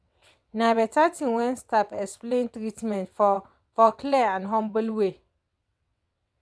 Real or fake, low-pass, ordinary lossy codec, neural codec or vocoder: fake; none; none; vocoder, 22.05 kHz, 80 mel bands, WaveNeXt